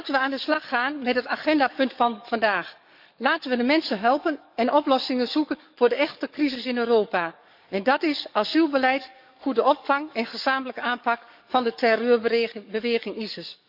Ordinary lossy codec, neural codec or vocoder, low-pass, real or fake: none; codec, 44.1 kHz, 7.8 kbps, DAC; 5.4 kHz; fake